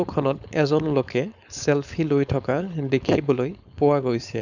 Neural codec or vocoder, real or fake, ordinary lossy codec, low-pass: codec, 16 kHz, 4.8 kbps, FACodec; fake; none; 7.2 kHz